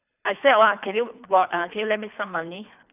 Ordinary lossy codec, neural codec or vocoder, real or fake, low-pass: none; codec, 24 kHz, 3 kbps, HILCodec; fake; 3.6 kHz